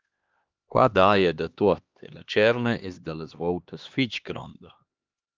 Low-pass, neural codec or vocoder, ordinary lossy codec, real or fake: 7.2 kHz; codec, 16 kHz, 2 kbps, X-Codec, HuBERT features, trained on LibriSpeech; Opus, 32 kbps; fake